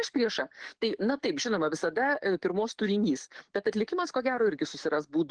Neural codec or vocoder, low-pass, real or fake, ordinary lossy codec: codec, 44.1 kHz, 7.8 kbps, DAC; 9.9 kHz; fake; Opus, 16 kbps